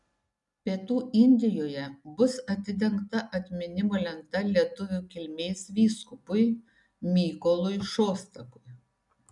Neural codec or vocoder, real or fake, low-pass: none; real; 10.8 kHz